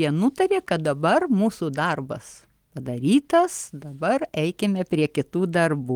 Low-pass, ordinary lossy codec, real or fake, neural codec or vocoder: 19.8 kHz; Opus, 24 kbps; real; none